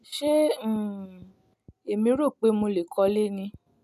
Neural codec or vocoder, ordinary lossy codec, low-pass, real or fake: none; none; 14.4 kHz; real